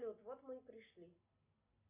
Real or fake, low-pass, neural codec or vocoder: real; 3.6 kHz; none